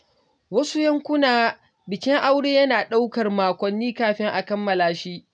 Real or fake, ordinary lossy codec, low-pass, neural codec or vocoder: real; none; 9.9 kHz; none